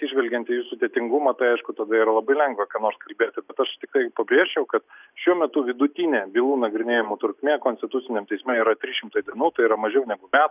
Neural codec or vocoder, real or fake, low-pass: none; real; 3.6 kHz